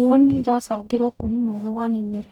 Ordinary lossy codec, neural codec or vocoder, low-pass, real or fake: none; codec, 44.1 kHz, 0.9 kbps, DAC; 19.8 kHz; fake